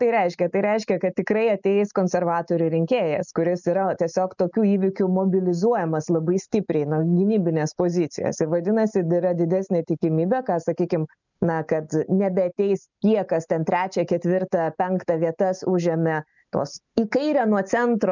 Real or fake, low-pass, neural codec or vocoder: real; 7.2 kHz; none